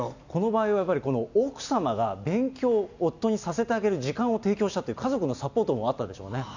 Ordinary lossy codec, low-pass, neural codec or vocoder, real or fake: none; 7.2 kHz; none; real